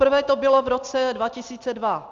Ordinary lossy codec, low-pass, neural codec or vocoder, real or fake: Opus, 32 kbps; 7.2 kHz; none; real